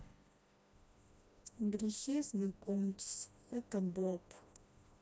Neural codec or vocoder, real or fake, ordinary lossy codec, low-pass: codec, 16 kHz, 1 kbps, FreqCodec, smaller model; fake; none; none